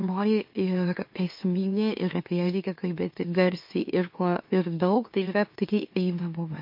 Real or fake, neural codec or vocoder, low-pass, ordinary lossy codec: fake; autoencoder, 44.1 kHz, a latent of 192 numbers a frame, MeloTTS; 5.4 kHz; MP3, 32 kbps